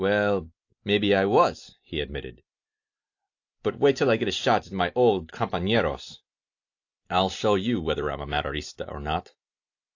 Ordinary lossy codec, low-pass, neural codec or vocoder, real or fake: AAC, 48 kbps; 7.2 kHz; none; real